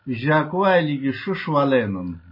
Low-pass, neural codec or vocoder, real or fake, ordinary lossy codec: 5.4 kHz; none; real; MP3, 24 kbps